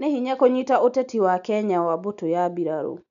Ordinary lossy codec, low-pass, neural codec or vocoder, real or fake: none; 7.2 kHz; none; real